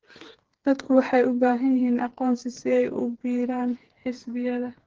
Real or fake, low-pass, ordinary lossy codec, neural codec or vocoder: fake; 7.2 kHz; Opus, 16 kbps; codec, 16 kHz, 4 kbps, FreqCodec, smaller model